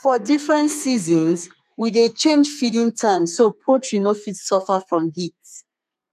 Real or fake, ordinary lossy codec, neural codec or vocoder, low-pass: fake; MP3, 96 kbps; codec, 32 kHz, 1.9 kbps, SNAC; 14.4 kHz